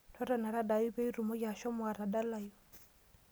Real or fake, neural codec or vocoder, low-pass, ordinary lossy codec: real; none; none; none